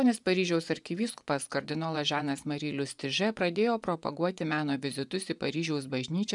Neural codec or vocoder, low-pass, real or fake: vocoder, 24 kHz, 100 mel bands, Vocos; 10.8 kHz; fake